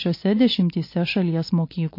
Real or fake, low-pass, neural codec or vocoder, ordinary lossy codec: real; 5.4 kHz; none; MP3, 32 kbps